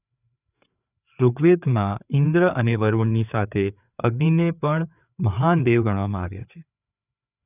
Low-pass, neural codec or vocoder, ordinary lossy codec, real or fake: 3.6 kHz; codec, 16 kHz, 4 kbps, FreqCodec, larger model; none; fake